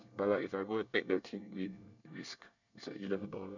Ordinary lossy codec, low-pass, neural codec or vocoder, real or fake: none; 7.2 kHz; codec, 24 kHz, 1 kbps, SNAC; fake